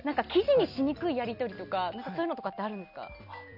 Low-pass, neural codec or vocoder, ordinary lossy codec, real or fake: 5.4 kHz; none; none; real